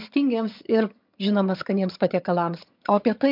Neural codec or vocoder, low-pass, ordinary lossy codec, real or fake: vocoder, 22.05 kHz, 80 mel bands, HiFi-GAN; 5.4 kHz; MP3, 48 kbps; fake